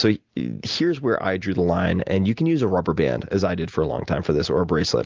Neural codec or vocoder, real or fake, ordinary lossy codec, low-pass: none; real; Opus, 24 kbps; 7.2 kHz